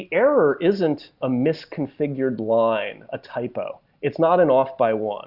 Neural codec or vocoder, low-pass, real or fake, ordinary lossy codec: none; 5.4 kHz; real; Opus, 64 kbps